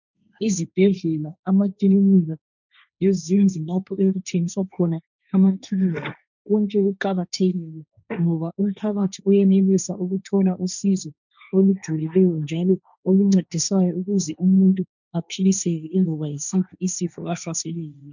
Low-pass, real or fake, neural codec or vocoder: 7.2 kHz; fake; codec, 16 kHz, 1.1 kbps, Voila-Tokenizer